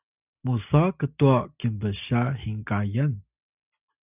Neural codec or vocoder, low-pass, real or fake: none; 3.6 kHz; real